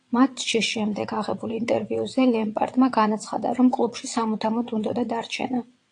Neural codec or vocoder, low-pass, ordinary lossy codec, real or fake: vocoder, 22.05 kHz, 80 mel bands, WaveNeXt; 9.9 kHz; AAC, 48 kbps; fake